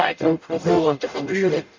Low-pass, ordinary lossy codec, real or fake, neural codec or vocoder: 7.2 kHz; MP3, 64 kbps; fake; codec, 44.1 kHz, 0.9 kbps, DAC